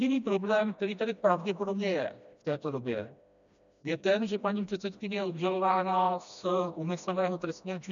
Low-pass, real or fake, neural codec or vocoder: 7.2 kHz; fake; codec, 16 kHz, 1 kbps, FreqCodec, smaller model